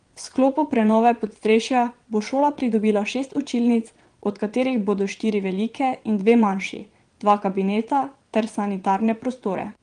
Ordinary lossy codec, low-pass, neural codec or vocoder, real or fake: Opus, 24 kbps; 9.9 kHz; vocoder, 22.05 kHz, 80 mel bands, WaveNeXt; fake